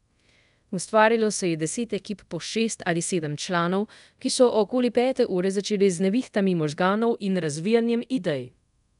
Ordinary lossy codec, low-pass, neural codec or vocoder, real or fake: none; 10.8 kHz; codec, 24 kHz, 0.5 kbps, DualCodec; fake